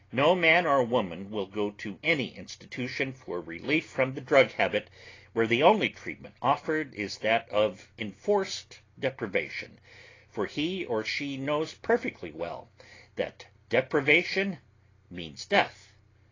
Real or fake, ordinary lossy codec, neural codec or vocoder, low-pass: real; AAC, 32 kbps; none; 7.2 kHz